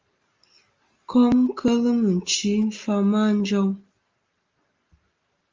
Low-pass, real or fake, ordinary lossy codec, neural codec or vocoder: 7.2 kHz; real; Opus, 32 kbps; none